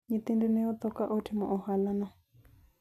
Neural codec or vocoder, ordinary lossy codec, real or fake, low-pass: none; none; real; 14.4 kHz